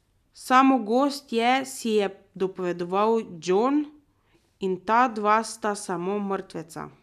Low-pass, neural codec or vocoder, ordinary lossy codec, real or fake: 14.4 kHz; none; none; real